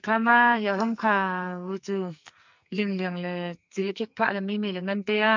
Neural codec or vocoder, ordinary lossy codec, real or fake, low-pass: codec, 44.1 kHz, 2.6 kbps, SNAC; MP3, 64 kbps; fake; 7.2 kHz